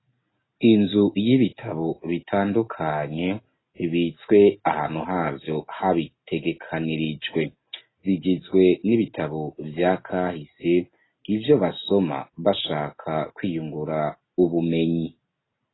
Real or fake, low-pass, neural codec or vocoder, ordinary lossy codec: real; 7.2 kHz; none; AAC, 16 kbps